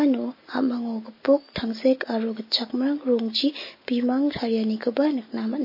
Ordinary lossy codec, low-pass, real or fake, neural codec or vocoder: MP3, 24 kbps; 5.4 kHz; real; none